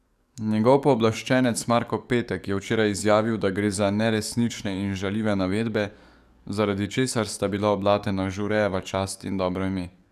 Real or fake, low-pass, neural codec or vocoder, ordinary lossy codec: fake; 14.4 kHz; codec, 44.1 kHz, 7.8 kbps, DAC; none